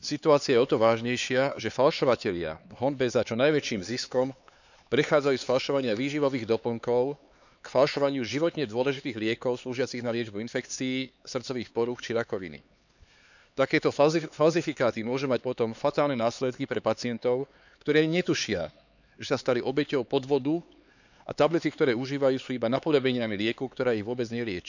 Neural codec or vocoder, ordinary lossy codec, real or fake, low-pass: codec, 16 kHz, 4 kbps, X-Codec, HuBERT features, trained on LibriSpeech; none; fake; 7.2 kHz